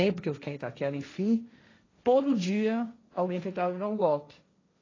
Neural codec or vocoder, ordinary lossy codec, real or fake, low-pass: codec, 16 kHz, 1.1 kbps, Voila-Tokenizer; AAC, 32 kbps; fake; 7.2 kHz